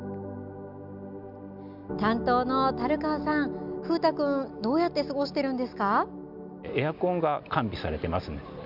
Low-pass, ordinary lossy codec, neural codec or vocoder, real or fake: 5.4 kHz; none; none; real